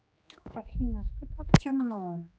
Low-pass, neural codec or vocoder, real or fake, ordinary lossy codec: none; codec, 16 kHz, 2 kbps, X-Codec, HuBERT features, trained on general audio; fake; none